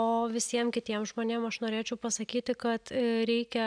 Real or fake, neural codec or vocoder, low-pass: real; none; 9.9 kHz